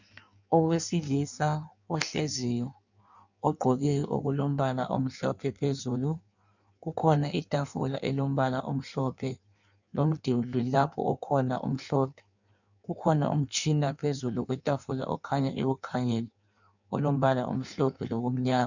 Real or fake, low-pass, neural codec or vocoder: fake; 7.2 kHz; codec, 16 kHz in and 24 kHz out, 1.1 kbps, FireRedTTS-2 codec